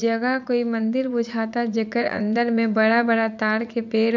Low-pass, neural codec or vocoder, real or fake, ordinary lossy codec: 7.2 kHz; vocoder, 44.1 kHz, 80 mel bands, Vocos; fake; none